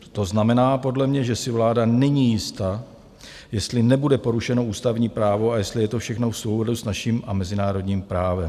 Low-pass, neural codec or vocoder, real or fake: 14.4 kHz; none; real